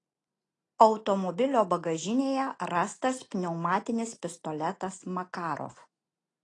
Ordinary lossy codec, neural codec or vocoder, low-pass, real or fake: AAC, 32 kbps; none; 10.8 kHz; real